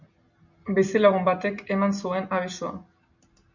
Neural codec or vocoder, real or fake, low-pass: none; real; 7.2 kHz